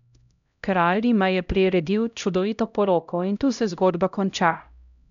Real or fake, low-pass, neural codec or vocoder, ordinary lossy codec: fake; 7.2 kHz; codec, 16 kHz, 0.5 kbps, X-Codec, HuBERT features, trained on LibriSpeech; none